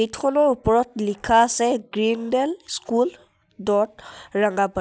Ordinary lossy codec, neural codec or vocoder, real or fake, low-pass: none; none; real; none